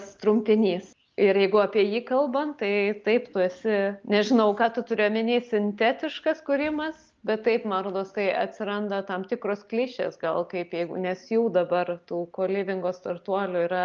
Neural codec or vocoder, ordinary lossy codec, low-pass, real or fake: none; Opus, 24 kbps; 7.2 kHz; real